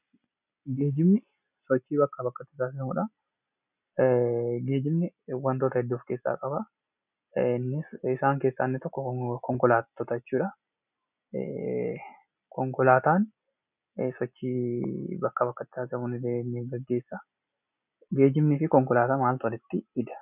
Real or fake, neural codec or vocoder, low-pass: real; none; 3.6 kHz